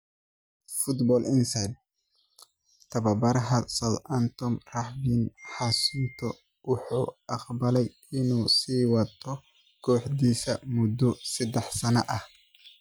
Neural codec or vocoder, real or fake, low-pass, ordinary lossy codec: none; real; none; none